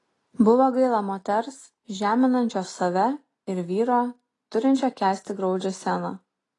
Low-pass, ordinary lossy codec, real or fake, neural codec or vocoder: 10.8 kHz; AAC, 32 kbps; real; none